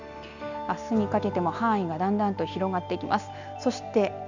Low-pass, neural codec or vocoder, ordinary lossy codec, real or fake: 7.2 kHz; none; none; real